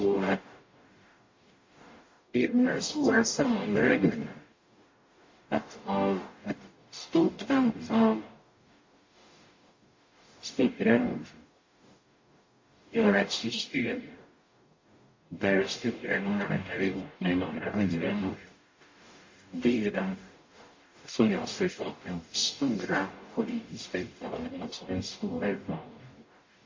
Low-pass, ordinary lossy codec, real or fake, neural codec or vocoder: 7.2 kHz; MP3, 32 kbps; fake; codec, 44.1 kHz, 0.9 kbps, DAC